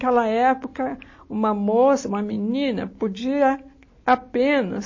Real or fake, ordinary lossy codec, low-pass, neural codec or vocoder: real; MP3, 32 kbps; 7.2 kHz; none